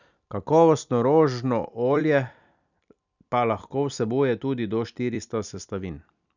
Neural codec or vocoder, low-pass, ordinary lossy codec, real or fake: vocoder, 44.1 kHz, 128 mel bands every 256 samples, BigVGAN v2; 7.2 kHz; none; fake